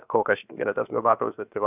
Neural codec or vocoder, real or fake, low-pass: codec, 16 kHz, 0.7 kbps, FocalCodec; fake; 3.6 kHz